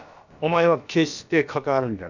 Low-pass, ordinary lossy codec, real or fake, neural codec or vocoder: 7.2 kHz; none; fake; codec, 16 kHz, about 1 kbps, DyCAST, with the encoder's durations